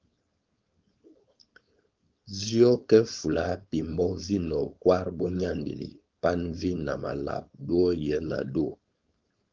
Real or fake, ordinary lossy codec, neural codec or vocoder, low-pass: fake; Opus, 32 kbps; codec, 16 kHz, 4.8 kbps, FACodec; 7.2 kHz